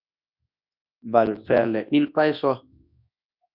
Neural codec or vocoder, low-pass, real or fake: codec, 24 kHz, 0.9 kbps, WavTokenizer, large speech release; 5.4 kHz; fake